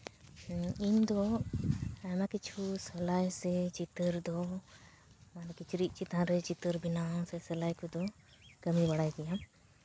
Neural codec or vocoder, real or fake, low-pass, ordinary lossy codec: none; real; none; none